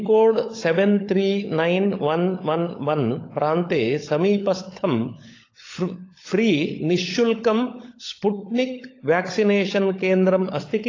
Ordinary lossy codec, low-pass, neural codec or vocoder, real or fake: AAC, 32 kbps; 7.2 kHz; codec, 16 kHz, 16 kbps, FunCodec, trained on LibriTTS, 50 frames a second; fake